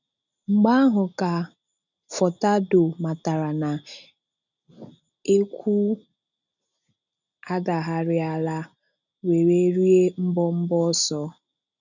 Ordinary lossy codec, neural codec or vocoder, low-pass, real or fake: none; none; 7.2 kHz; real